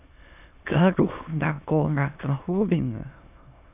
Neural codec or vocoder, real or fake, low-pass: autoencoder, 22.05 kHz, a latent of 192 numbers a frame, VITS, trained on many speakers; fake; 3.6 kHz